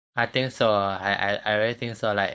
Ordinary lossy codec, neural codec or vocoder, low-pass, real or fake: none; codec, 16 kHz, 4.8 kbps, FACodec; none; fake